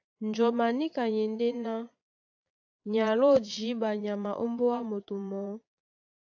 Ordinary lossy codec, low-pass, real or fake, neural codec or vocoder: AAC, 48 kbps; 7.2 kHz; fake; vocoder, 22.05 kHz, 80 mel bands, Vocos